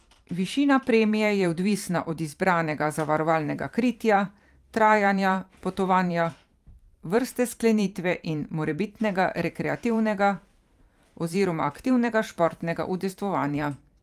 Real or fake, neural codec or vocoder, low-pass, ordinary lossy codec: fake; vocoder, 44.1 kHz, 128 mel bands every 512 samples, BigVGAN v2; 14.4 kHz; Opus, 32 kbps